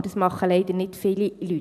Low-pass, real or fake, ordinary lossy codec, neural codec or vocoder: 14.4 kHz; real; none; none